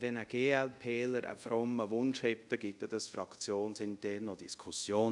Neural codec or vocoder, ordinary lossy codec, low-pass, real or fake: codec, 24 kHz, 0.5 kbps, DualCodec; none; 10.8 kHz; fake